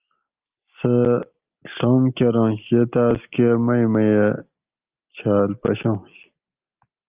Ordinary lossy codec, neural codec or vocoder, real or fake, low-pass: Opus, 32 kbps; none; real; 3.6 kHz